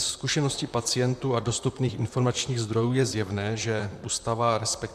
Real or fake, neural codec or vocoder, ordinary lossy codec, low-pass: fake; vocoder, 44.1 kHz, 128 mel bands, Pupu-Vocoder; MP3, 96 kbps; 14.4 kHz